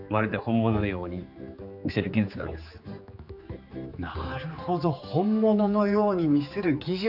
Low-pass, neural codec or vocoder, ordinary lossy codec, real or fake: 5.4 kHz; codec, 16 kHz, 4 kbps, X-Codec, HuBERT features, trained on general audio; none; fake